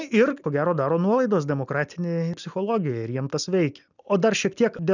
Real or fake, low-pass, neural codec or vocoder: real; 7.2 kHz; none